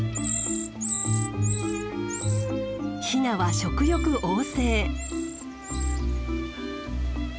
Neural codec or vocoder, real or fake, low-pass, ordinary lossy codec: none; real; none; none